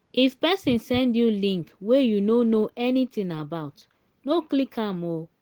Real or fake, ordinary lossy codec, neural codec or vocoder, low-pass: real; Opus, 16 kbps; none; 19.8 kHz